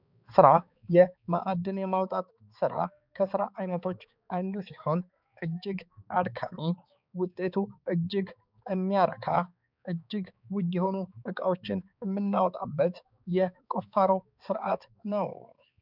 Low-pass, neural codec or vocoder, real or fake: 5.4 kHz; codec, 16 kHz, 4 kbps, X-Codec, HuBERT features, trained on balanced general audio; fake